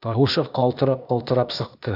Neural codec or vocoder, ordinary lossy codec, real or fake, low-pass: codec, 16 kHz, 0.8 kbps, ZipCodec; AAC, 48 kbps; fake; 5.4 kHz